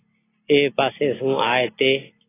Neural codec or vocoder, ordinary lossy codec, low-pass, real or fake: none; AAC, 16 kbps; 3.6 kHz; real